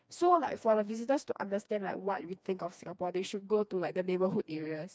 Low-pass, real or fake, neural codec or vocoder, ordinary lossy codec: none; fake; codec, 16 kHz, 2 kbps, FreqCodec, smaller model; none